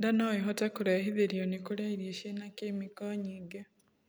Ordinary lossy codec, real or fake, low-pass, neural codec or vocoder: none; real; none; none